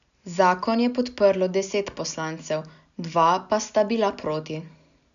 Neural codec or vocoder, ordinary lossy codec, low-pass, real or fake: none; none; 7.2 kHz; real